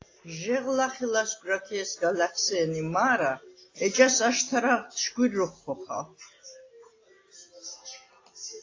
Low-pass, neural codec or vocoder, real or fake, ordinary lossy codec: 7.2 kHz; none; real; AAC, 32 kbps